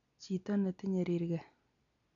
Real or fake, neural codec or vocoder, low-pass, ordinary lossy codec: real; none; 7.2 kHz; none